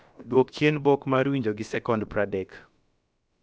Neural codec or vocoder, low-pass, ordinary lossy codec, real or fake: codec, 16 kHz, about 1 kbps, DyCAST, with the encoder's durations; none; none; fake